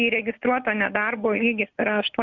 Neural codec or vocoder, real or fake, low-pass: none; real; 7.2 kHz